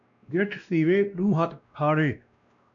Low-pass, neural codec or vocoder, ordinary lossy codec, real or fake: 7.2 kHz; codec, 16 kHz, 1 kbps, X-Codec, WavLM features, trained on Multilingual LibriSpeech; MP3, 96 kbps; fake